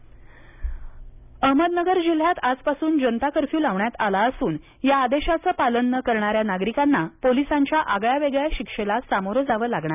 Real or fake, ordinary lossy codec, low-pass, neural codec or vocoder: real; none; 3.6 kHz; none